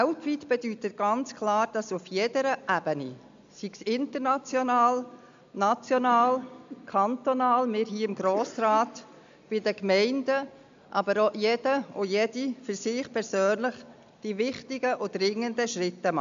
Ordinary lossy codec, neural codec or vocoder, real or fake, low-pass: MP3, 64 kbps; none; real; 7.2 kHz